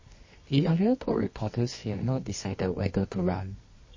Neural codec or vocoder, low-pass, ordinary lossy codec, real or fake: codec, 24 kHz, 0.9 kbps, WavTokenizer, medium music audio release; 7.2 kHz; MP3, 32 kbps; fake